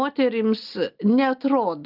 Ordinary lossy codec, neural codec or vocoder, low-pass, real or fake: Opus, 24 kbps; none; 5.4 kHz; real